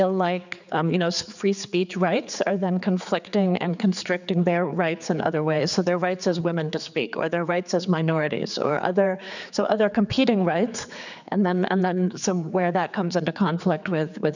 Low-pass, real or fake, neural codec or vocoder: 7.2 kHz; fake; codec, 16 kHz, 4 kbps, X-Codec, HuBERT features, trained on general audio